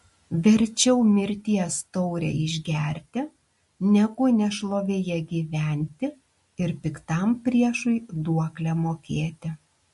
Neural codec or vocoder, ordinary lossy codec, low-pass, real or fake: none; MP3, 48 kbps; 14.4 kHz; real